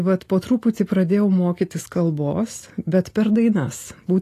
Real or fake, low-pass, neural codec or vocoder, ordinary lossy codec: real; 14.4 kHz; none; AAC, 48 kbps